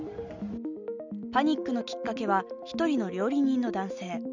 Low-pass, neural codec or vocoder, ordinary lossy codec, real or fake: 7.2 kHz; none; none; real